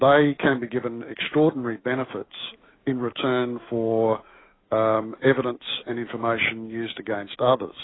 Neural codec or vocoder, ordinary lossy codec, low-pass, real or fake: autoencoder, 48 kHz, 128 numbers a frame, DAC-VAE, trained on Japanese speech; AAC, 16 kbps; 7.2 kHz; fake